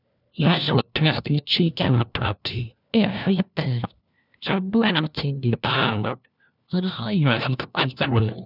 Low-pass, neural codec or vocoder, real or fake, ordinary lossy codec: 5.4 kHz; codec, 16 kHz, 1 kbps, FunCodec, trained on LibriTTS, 50 frames a second; fake; none